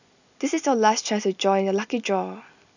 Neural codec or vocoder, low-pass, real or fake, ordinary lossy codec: none; 7.2 kHz; real; none